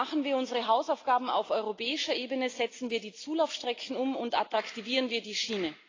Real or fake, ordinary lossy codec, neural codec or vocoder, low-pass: real; AAC, 32 kbps; none; 7.2 kHz